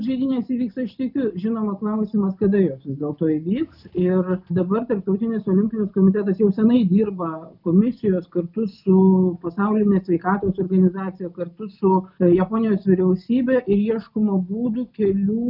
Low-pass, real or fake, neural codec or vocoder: 5.4 kHz; real; none